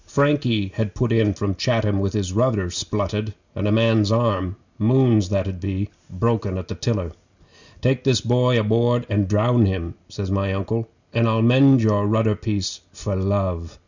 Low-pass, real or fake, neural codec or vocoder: 7.2 kHz; real; none